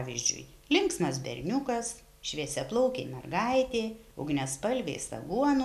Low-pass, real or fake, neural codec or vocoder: 14.4 kHz; real; none